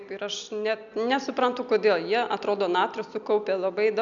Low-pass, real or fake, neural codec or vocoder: 7.2 kHz; real; none